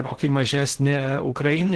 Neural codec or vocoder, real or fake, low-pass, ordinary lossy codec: codec, 16 kHz in and 24 kHz out, 0.8 kbps, FocalCodec, streaming, 65536 codes; fake; 10.8 kHz; Opus, 16 kbps